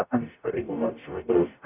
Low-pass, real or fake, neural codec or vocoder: 3.6 kHz; fake; codec, 44.1 kHz, 0.9 kbps, DAC